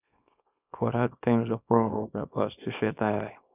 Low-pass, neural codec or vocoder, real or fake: 3.6 kHz; codec, 24 kHz, 0.9 kbps, WavTokenizer, small release; fake